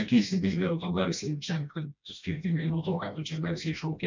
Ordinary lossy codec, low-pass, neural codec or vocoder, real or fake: MP3, 64 kbps; 7.2 kHz; codec, 16 kHz, 1 kbps, FreqCodec, smaller model; fake